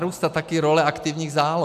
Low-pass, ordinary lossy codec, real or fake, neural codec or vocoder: 14.4 kHz; MP3, 96 kbps; real; none